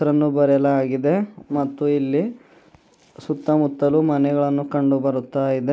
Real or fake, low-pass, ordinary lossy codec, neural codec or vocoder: real; none; none; none